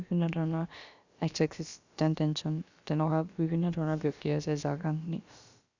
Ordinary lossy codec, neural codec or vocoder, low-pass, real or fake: Opus, 64 kbps; codec, 16 kHz, about 1 kbps, DyCAST, with the encoder's durations; 7.2 kHz; fake